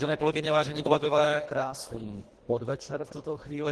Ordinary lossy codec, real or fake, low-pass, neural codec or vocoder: Opus, 16 kbps; fake; 10.8 kHz; codec, 24 kHz, 1.5 kbps, HILCodec